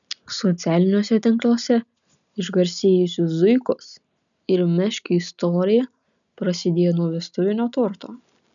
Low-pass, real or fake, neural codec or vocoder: 7.2 kHz; real; none